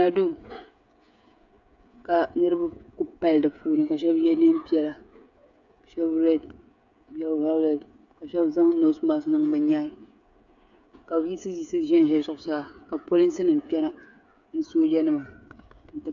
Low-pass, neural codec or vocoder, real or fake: 7.2 kHz; codec, 16 kHz, 8 kbps, FreqCodec, smaller model; fake